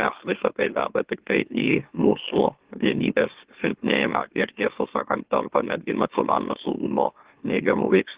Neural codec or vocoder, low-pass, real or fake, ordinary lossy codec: autoencoder, 44.1 kHz, a latent of 192 numbers a frame, MeloTTS; 3.6 kHz; fake; Opus, 16 kbps